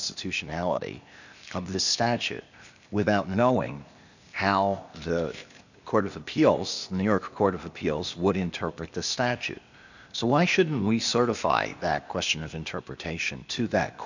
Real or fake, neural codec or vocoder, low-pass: fake; codec, 16 kHz, 0.8 kbps, ZipCodec; 7.2 kHz